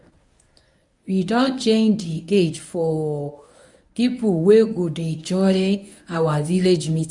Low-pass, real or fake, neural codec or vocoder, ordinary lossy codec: 10.8 kHz; fake; codec, 24 kHz, 0.9 kbps, WavTokenizer, medium speech release version 1; none